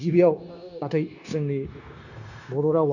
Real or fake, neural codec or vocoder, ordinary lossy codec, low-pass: fake; codec, 16 kHz, 0.9 kbps, LongCat-Audio-Codec; none; 7.2 kHz